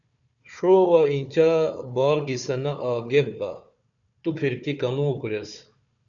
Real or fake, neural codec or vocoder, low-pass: fake; codec, 16 kHz, 4 kbps, FunCodec, trained on Chinese and English, 50 frames a second; 7.2 kHz